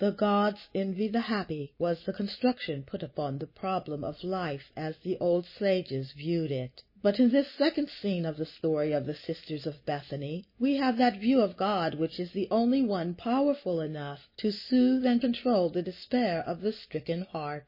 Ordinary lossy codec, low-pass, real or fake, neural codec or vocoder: MP3, 24 kbps; 5.4 kHz; real; none